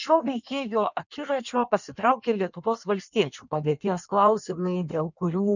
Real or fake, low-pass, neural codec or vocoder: fake; 7.2 kHz; codec, 16 kHz in and 24 kHz out, 1.1 kbps, FireRedTTS-2 codec